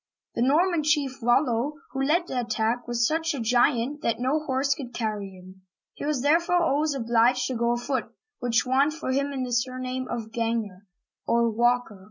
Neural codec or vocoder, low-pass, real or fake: none; 7.2 kHz; real